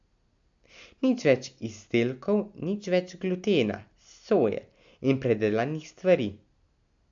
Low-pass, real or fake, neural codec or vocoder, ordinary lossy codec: 7.2 kHz; real; none; AAC, 64 kbps